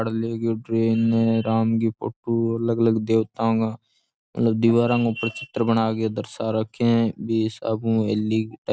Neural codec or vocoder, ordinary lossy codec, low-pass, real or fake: none; none; none; real